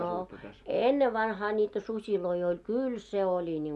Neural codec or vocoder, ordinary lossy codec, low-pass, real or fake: none; none; none; real